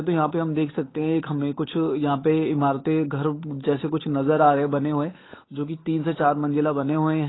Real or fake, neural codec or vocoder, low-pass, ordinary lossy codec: fake; codec, 16 kHz, 8 kbps, FunCodec, trained on Chinese and English, 25 frames a second; 7.2 kHz; AAC, 16 kbps